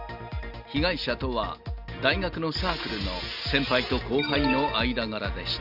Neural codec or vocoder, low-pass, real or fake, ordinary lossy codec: none; 5.4 kHz; real; none